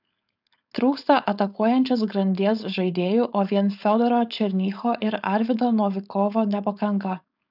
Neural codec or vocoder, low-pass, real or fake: codec, 16 kHz, 4.8 kbps, FACodec; 5.4 kHz; fake